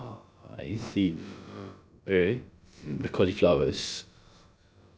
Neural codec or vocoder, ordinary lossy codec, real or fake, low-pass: codec, 16 kHz, about 1 kbps, DyCAST, with the encoder's durations; none; fake; none